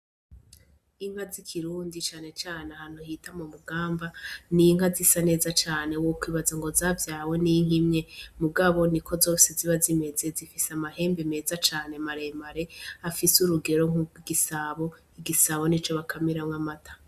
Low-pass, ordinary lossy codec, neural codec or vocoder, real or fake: 14.4 kHz; Opus, 64 kbps; none; real